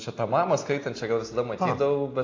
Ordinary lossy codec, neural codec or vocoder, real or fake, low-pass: AAC, 32 kbps; none; real; 7.2 kHz